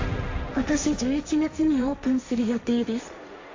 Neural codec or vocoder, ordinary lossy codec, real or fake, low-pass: codec, 16 kHz, 1.1 kbps, Voila-Tokenizer; AAC, 48 kbps; fake; 7.2 kHz